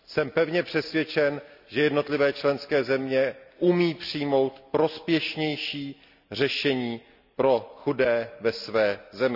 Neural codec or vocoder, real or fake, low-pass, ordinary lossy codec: none; real; 5.4 kHz; none